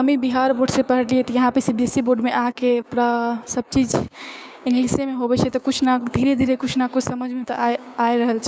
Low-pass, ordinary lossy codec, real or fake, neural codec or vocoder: none; none; fake; codec, 16 kHz, 6 kbps, DAC